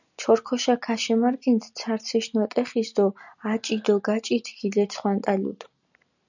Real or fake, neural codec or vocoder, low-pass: real; none; 7.2 kHz